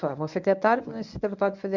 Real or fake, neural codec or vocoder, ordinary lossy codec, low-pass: fake; codec, 24 kHz, 0.9 kbps, WavTokenizer, medium speech release version 1; none; 7.2 kHz